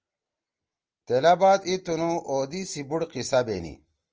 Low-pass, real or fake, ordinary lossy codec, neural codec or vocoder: 7.2 kHz; real; Opus, 24 kbps; none